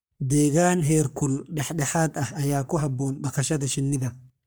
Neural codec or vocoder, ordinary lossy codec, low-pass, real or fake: codec, 44.1 kHz, 3.4 kbps, Pupu-Codec; none; none; fake